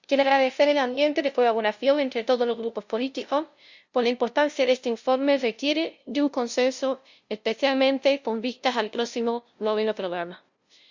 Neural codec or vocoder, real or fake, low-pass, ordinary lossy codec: codec, 16 kHz, 0.5 kbps, FunCodec, trained on LibriTTS, 25 frames a second; fake; 7.2 kHz; Opus, 64 kbps